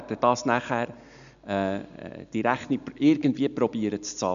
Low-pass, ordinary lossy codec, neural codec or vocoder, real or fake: 7.2 kHz; none; none; real